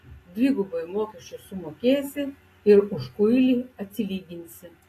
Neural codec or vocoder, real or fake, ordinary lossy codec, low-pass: none; real; AAC, 48 kbps; 14.4 kHz